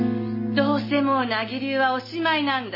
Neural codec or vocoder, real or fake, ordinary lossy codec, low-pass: none; real; MP3, 24 kbps; 5.4 kHz